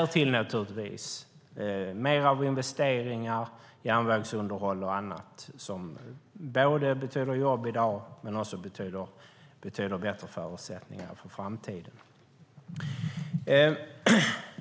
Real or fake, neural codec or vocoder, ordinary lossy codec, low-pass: real; none; none; none